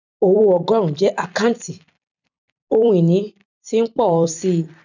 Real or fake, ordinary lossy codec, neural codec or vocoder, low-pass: fake; none; vocoder, 44.1 kHz, 128 mel bands every 256 samples, BigVGAN v2; 7.2 kHz